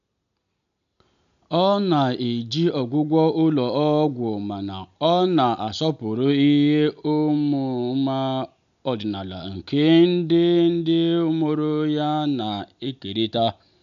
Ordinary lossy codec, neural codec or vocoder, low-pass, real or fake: none; none; 7.2 kHz; real